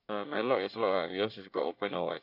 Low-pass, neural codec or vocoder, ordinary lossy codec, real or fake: 5.4 kHz; codec, 24 kHz, 1 kbps, SNAC; none; fake